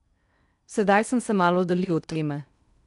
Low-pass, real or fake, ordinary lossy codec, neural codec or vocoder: 10.8 kHz; fake; none; codec, 16 kHz in and 24 kHz out, 0.8 kbps, FocalCodec, streaming, 65536 codes